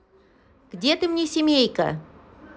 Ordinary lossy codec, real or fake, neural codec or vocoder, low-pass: none; real; none; none